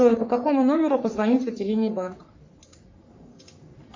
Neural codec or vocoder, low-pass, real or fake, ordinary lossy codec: codec, 44.1 kHz, 3.4 kbps, Pupu-Codec; 7.2 kHz; fake; MP3, 64 kbps